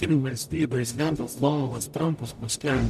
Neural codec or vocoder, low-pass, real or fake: codec, 44.1 kHz, 0.9 kbps, DAC; 14.4 kHz; fake